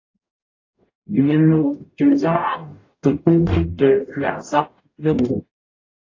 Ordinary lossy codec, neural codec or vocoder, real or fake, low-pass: AAC, 32 kbps; codec, 44.1 kHz, 0.9 kbps, DAC; fake; 7.2 kHz